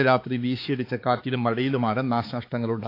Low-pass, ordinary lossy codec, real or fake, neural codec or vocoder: 5.4 kHz; AAC, 32 kbps; fake; codec, 16 kHz, 4 kbps, X-Codec, HuBERT features, trained on balanced general audio